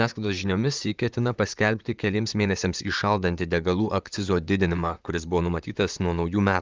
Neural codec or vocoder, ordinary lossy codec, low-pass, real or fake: vocoder, 44.1 kHz, 128 mel bands, Pupu-Vocoder; Opus, 32 kbps; 7.2 kHz; fake